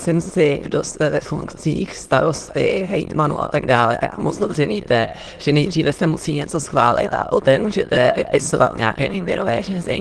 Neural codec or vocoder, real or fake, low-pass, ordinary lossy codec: autoencoder, 22.05 kHz, a latent of 192 numbers a frame, VITS, trained on many speakers; fake; 9.9 kHz; Opus, 16 kbps